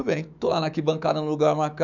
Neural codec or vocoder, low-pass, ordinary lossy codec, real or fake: autoencoder, 48 kHz, 128 numbers a frame, DAC-VAE, trained on Japanese speech; 7.2 kHz; none; fake